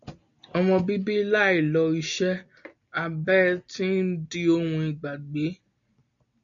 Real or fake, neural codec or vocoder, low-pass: real; none; 7.2 kHz